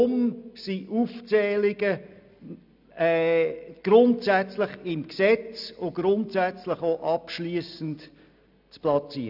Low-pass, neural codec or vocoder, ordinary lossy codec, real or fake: 5.4 kHz; none; none; real